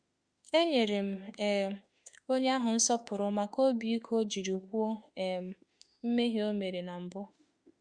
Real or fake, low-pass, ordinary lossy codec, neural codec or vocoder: fake; 9.9 kHz; Opus, 64 kbps; autoencoder, 48 kHz, 32 numbers a frame, DAC-VAE, trained on Japanese speech